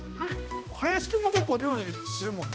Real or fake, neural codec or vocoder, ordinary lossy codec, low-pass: fake; codec, 16 kHz, 1 kbps, X-Codec, HuBERT features, trained on balanced general audio; none; none